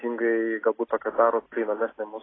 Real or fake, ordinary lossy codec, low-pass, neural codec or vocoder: real; AAC, 16 kbps; 7.2 kHz; none